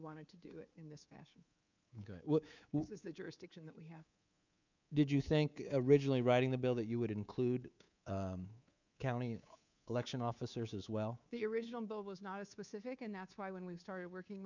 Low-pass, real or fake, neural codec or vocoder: 7.2 kHz; fake; codec, 24 kHz, 3.1 kbps, DualCodec